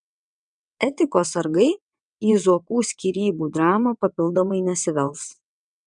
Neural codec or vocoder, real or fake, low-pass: vocoder, 22.05 kHz, 80 mel bands, WaveNeXt; fake; 9.9 kHz